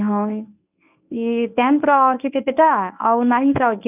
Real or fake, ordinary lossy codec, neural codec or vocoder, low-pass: fake; none; codec, 24 kHz, 0.9 kbps, WavTokenizer, medium speech release version 1; 3.6 kHz